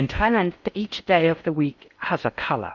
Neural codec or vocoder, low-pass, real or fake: codec, 16 kHz in and 24 kHz out, 0.8 kbps, FocalCodec, streaming, 65536 codes; 7.2 kHz; fake